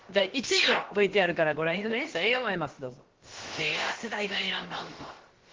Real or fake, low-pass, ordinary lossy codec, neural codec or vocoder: fake; 7.2 kHz; Opus, 16 kbps; codec, 16 kHz, about 1 kbps, DyCAST, with the encoder's durations